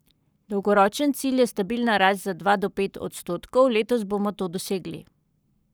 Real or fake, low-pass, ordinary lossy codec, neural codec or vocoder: fake; none; none; codec, 44.1 kHz, 7.8 kbps, Pupu-Codec